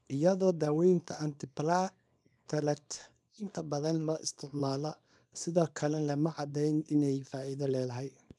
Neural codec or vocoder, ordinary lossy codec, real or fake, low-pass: codec, 24 kHz, 0.9 kbps, WavTokenizer, small release; none; fake; none